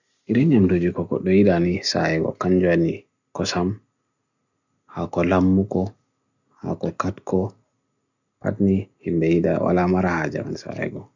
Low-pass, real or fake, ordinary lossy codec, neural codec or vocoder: 7.2 kHz; real; none; none